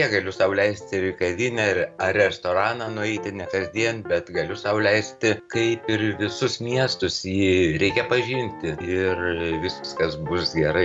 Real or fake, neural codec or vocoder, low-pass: real; none; 10.8 kHz